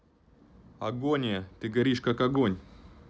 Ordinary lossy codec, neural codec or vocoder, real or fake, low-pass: none; none; real; none